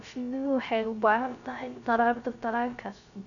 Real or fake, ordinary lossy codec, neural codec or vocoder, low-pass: fake; none; codec, 16 kHz, 0.3 kbps, FocalCodec; 7.2 kHz